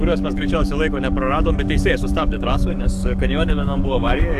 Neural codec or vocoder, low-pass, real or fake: codec, 44.1 kHz, 7.8 kbps, DAC; 14.4 kHz; fake